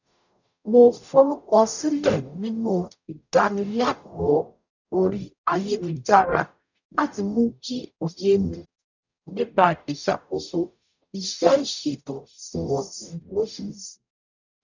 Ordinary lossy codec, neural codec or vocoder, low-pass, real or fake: none; codec, 44.1 kHz, 0.9 kbps, DAC; 7.2 kHz; fake